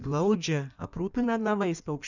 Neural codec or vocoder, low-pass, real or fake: codec, 16 kHz in and 24 kHz out, 1.1 kbps, FireRedTTS-2 codec; 7.2 kHz; fake